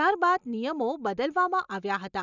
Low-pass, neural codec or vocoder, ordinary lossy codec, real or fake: 7.2 kHz; none; none; real